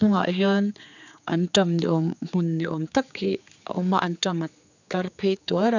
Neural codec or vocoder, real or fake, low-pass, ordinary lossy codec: codec, 16 kHz, 4 kbps, X-Codec, HuBERT features, trained on general audio; fake; 7.2 kHz; none